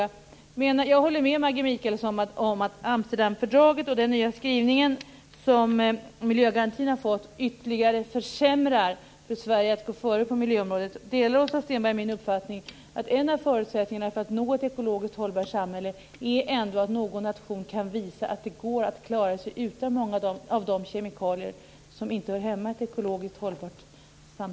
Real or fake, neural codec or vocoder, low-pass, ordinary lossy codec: real; none; none; none